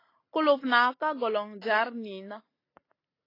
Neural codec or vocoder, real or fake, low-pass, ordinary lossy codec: none; real; 5.4 kHz; AAC, 24 kbps